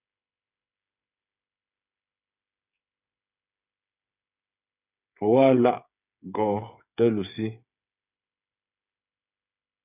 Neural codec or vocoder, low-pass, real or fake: codec, 16 kHz, 8 kbps, FreqCodec, smaller model; 3.6 kHz; fake